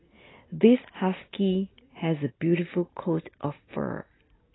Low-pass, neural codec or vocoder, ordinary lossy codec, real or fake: 7.2 kHz; none; AAC, 16 kbps; real